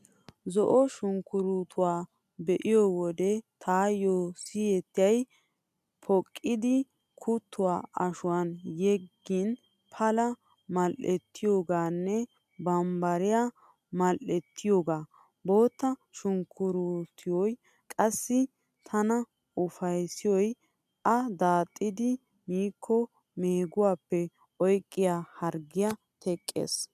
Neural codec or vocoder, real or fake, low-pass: none; real; 14.4 kHz